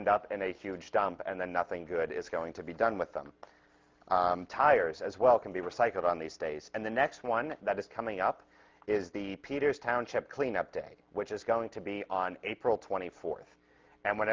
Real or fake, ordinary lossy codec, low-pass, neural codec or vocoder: real; Opus, 32 kbps; 7.2 kHz; none